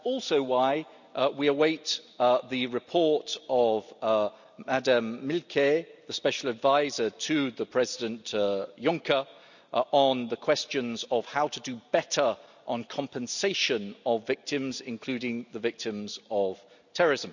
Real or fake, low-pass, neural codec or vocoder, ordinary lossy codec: real; 7.2 kHz; none; none